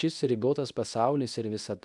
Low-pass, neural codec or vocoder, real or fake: 10.8 kHz; codec, 24 kHz, 0.9 kbps, WavTokenizer, medium speech release version 2; fake